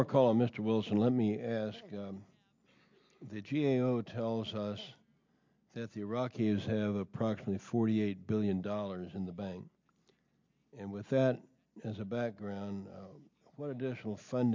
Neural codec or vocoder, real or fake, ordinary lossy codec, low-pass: none; real; MP3, 48 kbps; 7.2 kHz